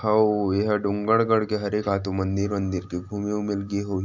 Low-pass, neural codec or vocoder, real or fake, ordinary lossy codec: 7.2 kHz; none; real; none